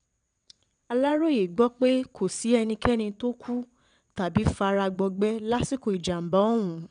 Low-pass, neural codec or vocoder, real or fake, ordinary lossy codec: 9.9 kHz; vocoder, 22.05 kHz, 80 mel bands, WaveNeXt; fake; none